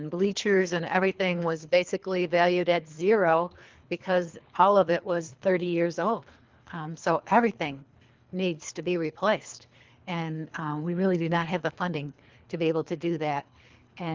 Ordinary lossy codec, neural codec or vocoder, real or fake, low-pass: Opus, 32 kbps; codec, 24 kHz, 3 kbps, HILCodec; fake; 7.2 kHz